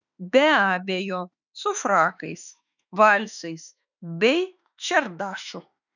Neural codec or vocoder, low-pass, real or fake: autoencoder, 48 kHz, 32 numbers a frame, DAC-VAE, trained on Japanese speech; 7.2 kHz; fake